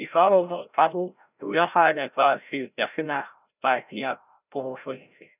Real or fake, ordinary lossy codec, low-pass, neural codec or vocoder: fake; none; 3.6 kHz; codec, 16 kHz, 0.5 kbps, FreqCodec, larger model